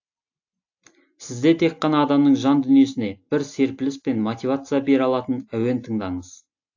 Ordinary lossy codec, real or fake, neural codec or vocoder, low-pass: AAC, 48 kbps; real; none; 7.2 kHz